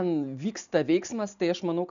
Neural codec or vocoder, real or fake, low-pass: none; real; 7.2 kHz